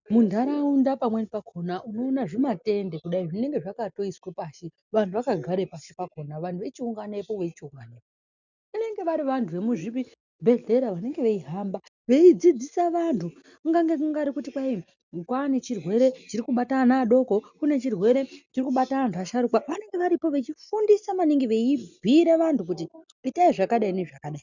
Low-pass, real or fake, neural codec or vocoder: 7.2 kHz; real; none